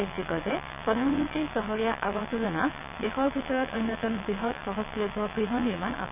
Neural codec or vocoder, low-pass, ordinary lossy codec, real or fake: vocoder, 22.05 kHz, 80 mel bands, WaveNeXt; 3.6 kHz; none; fake